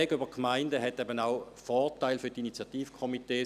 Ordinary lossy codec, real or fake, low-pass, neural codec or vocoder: none; real; 14.4 kHz; none